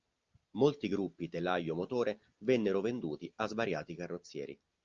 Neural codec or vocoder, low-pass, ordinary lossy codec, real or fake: none; 7.2 kHz; Opus, 24 kbps; real